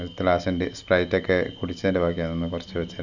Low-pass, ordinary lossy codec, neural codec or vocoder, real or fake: 7.2 kHz; none; none; real